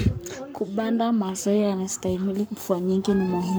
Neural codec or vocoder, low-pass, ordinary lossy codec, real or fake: codec, 44.1 kHz, 7.8 kbps, Pupu-Codec; none; none; fake